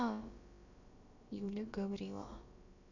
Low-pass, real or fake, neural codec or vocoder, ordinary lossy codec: 7.2 kHz; fake; codec, 16 kHz, about 1 kbps, DyCAST, with the encoder's durations; none